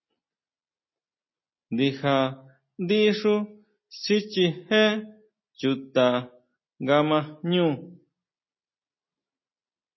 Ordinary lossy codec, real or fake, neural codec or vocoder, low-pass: MP3, 24 kbps; real; none; 7.2 kHz